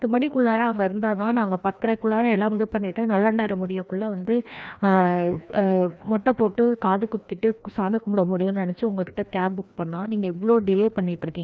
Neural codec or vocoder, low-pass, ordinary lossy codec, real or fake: codec, 16 kHz, 1 kbps, FreqCodec, larger model; none; none; fake